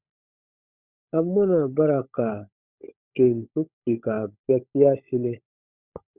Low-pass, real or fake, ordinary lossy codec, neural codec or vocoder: 3.6 kHz; fake; Opus, 64 kbps; codec, 16 kHz, 16 kbps, FunCodec, trained on LibriTTS, 50 frames a second